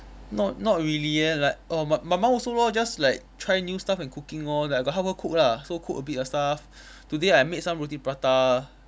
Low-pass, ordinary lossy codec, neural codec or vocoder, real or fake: none; none; none; real